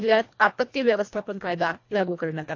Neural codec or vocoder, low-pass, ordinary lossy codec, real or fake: codec, 24 kHz, 1.5 kbps, HILCodec; 7.2 kHz; AAC, 48 kbps; fake